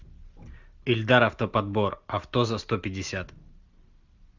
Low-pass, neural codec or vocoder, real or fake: 7.2 kHz; none; real